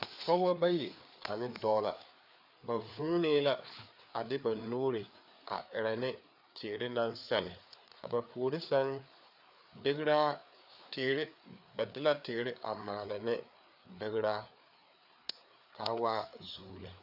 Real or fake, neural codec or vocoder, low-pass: fake; codec, 16 kHz, 4 kbps, FreqCodec, larger model; 5.4 kHz